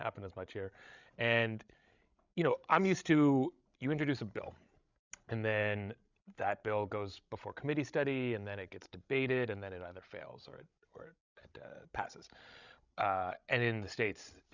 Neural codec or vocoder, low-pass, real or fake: codec, 16 kHz, 16 kbps, FreqCodec, larger model; 7.2 kHz; fake